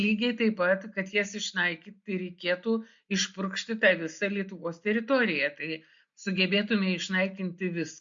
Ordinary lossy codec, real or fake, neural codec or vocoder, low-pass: MP3, 48 kbps; real; none; 7.2 kHz